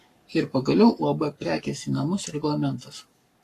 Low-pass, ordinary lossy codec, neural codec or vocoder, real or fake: 14.4 kHz; AAC, 48 kbps; codec, 44.1 kHz, 7.8 kbps, Pupu-Codec; fake